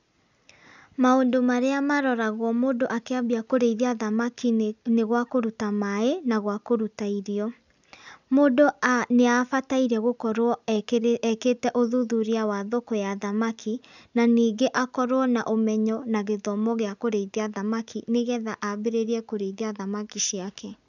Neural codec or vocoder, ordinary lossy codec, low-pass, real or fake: none; none; 7.2 kHz; real